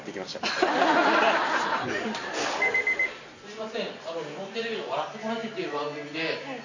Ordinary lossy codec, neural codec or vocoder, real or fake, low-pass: none; none; real; 7.2 kHz